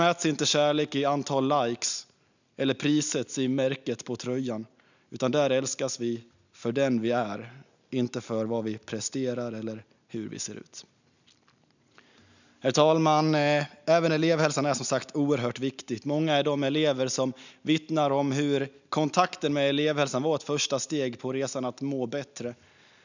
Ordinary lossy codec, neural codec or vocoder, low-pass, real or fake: none; none; 7.2 kHz; real